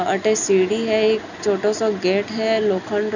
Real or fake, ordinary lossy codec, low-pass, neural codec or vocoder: real; none; 7.2 kHz; none